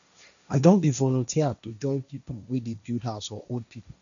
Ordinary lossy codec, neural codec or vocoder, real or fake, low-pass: none; codec, 16 kHz, 1.1 kbps, Voila-Tokenizer; fake; 7.2 kHz